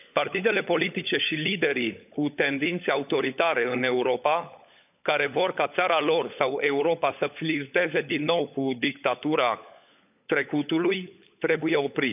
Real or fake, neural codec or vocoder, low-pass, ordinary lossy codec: fake; codec, 16 kHz, 16 kbps, FunCodec, trained on LibriTTS, 50 frames a second; 3.6 kHz; none